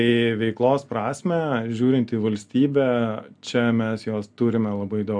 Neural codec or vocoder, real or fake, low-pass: none; real; 9.9 kHz